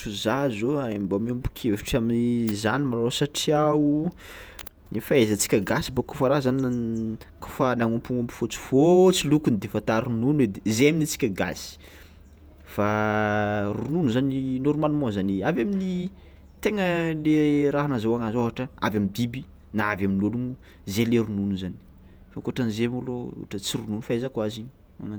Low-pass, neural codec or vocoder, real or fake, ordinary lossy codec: none; vocoder, 48 kHz, 128 mel bands, Vocos; fake; none